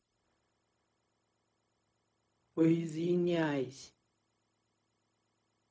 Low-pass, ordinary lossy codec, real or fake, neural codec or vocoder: none; none; fake; codec, 16 kHz, 0.4 kbps, LongCat-Audio-Codec